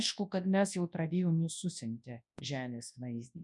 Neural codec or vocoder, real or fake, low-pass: codec, 24 kHz, 0.9 kbps, WavTokenizer, large speech release; fake; 10.8 kHz